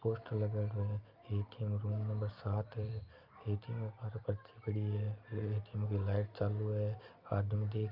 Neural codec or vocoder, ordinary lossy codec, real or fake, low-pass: none; Opus, 32 kbps; real; 5.4 kHz